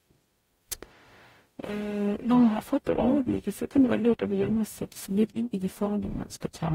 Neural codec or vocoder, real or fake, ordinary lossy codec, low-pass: codec, 44.1 kHz, 0.9 kbps, DAC; fake; AAC, 48 kbps; 19.8 kHz